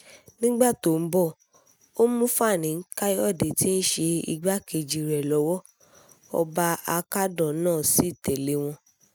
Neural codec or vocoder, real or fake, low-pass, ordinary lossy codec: none; real; none; none